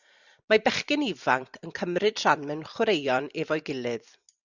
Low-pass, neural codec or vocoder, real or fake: 7.2 kHz; vocoder, 44.1 kHz, 128 mel bands every 256 samples, BigVGAN v2; fake